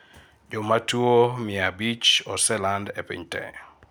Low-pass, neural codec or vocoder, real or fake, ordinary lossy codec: none; none; real; none